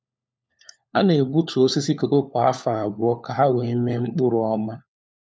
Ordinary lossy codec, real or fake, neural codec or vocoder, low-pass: none; fake; codec, 16 kHz, 4 kbps, FunCodec, trained on LibriTTS, 50 frames a second; none